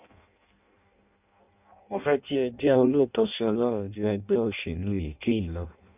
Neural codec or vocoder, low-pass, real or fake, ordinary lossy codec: codec, 16 kHz in and 24 kHz out, 0.6 kbps, FireRedTTS-2 codec; 3.6 kHz; fake; none